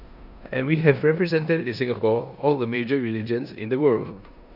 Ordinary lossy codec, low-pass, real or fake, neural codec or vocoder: none; 5.4 kHz; fake; codec, 16 kHz in and 24 kHz out, 0.9 kbps, LongCat-Audio-Codec, four codebook decoder